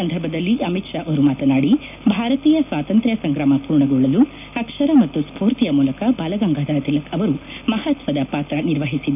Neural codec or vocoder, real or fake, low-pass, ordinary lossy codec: none; real; 3.6 kHz; none